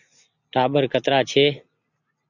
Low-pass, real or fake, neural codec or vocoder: 7.2 kHz; real; none